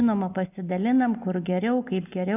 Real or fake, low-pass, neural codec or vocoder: real; 3.6 kHz; none